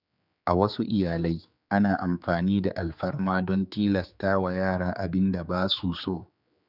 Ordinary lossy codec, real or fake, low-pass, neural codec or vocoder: none; fake; 5.4 kHz; codec, 16 kHz, 4 kbps, X-Codec, HuBERT features, trained on general audio